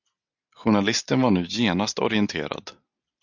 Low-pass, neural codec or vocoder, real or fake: 7.2 kHz; none; real